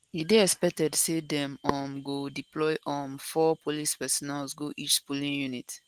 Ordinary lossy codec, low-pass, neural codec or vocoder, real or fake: Opus, 24 kbps; 14.4 kHz; none; real